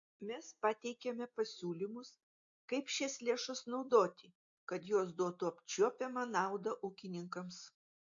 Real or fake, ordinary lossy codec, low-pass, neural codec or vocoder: real; AAC, 64 kbps; 7.2 kHz; none